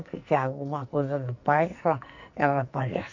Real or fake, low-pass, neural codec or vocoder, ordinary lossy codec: fake; 7.2 kHz; codec, 44.1 kHz, 2.6 kbps, SNAC; none